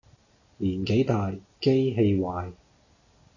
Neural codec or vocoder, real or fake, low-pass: none; real; 7.2 kHz